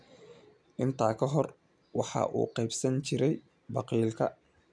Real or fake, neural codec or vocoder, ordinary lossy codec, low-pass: real; none; none; 9.9 kHz